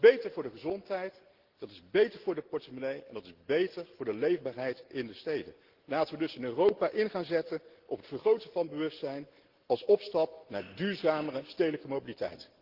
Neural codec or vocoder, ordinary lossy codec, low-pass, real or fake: none; Opus, 16 kbps; 5.4 kHz; real